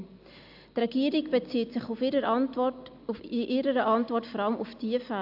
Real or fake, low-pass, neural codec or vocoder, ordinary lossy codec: real; 5.4 kHz; none; AAC, 48 kbps